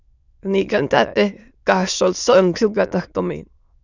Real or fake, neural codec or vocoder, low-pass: fake; autoencoder, 22.05 kHz, a latent of 192 numbers a frame, VITS, trained on many speakers; 7.2 kHz